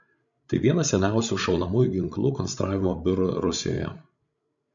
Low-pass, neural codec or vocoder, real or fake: 7.2 kHz; codec, 16 kHz, 16 kbps, FreqCodec, larger model; fake